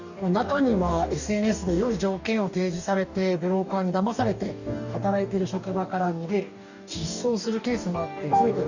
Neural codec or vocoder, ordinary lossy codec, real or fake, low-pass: codec, 44.1 kHz, 2.6 kbps, DAC; none; fake; 7.2 kHz